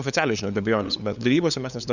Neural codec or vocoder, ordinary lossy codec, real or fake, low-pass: codec, 16 kHz, 8 kbps, FunCodec, trained on LibriTTS, 25 frames a second; Opus, 64 kbps; fake; 7.2 kHz